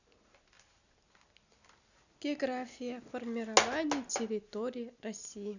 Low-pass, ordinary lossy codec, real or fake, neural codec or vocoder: 7.2 kHz; none; real; none